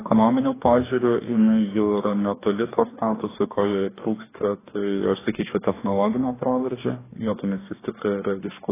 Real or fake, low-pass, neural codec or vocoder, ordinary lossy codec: fake; 3.6 kHz; codec, 44.1 kHz, 2.6 kbps, DAC; AAC, 16 kbps